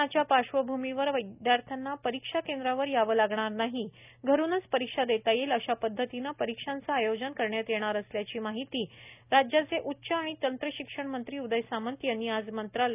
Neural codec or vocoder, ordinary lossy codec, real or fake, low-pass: none; none; real; 3.6 kHz